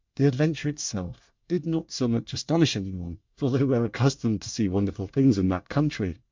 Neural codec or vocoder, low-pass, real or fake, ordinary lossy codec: codec, 24 kHz, 1 kbps, SNAC; 7.2 kHz; fake; MP3, 64 kbps